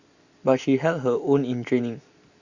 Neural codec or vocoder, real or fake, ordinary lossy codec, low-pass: none; real; Opus, 64 kbps; 7.2 kHz